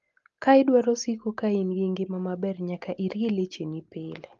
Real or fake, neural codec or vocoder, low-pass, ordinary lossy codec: real; none; 7.2 kHz; Opus, 32 kbps